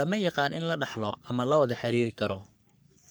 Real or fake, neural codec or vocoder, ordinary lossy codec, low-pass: fake; codec, 44.1 kHz, 3.4 kbps, Pupu-Codec; none; none